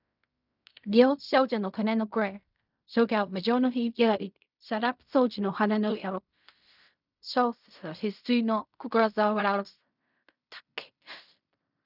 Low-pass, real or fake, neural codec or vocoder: 5.4 kHz; fake; codec, 16 kHz in and 24 kHz out, 0.4 kbps, LongCat-Audio-Codec, fine tuned four codebook decoder